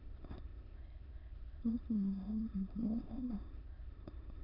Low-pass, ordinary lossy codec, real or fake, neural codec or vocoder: 5.4 kHz; Opus, 64 kbps; fake; autoencoder, 22.05 kHz, a latent of 192 numbers a frame, VITS, trained on many speakers